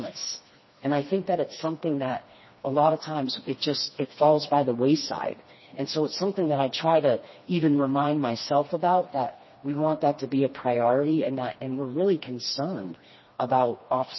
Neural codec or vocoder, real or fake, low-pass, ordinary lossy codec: codec, 16 kHz, 2 kbps, FreqCodec, smaller model; fake; 7.2 kHz; MP3, 24 kbps